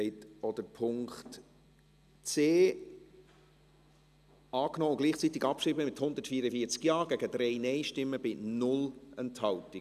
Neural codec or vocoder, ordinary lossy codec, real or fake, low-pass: none; none; real; 14.4 kHz